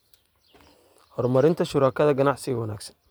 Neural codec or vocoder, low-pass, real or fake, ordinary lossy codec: none; none; real; none